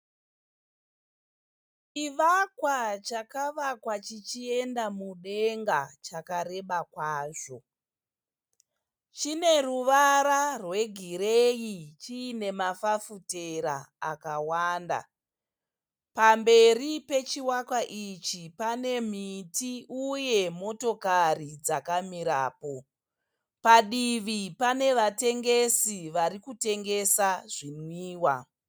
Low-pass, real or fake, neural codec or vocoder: 19.8 kHz; real; none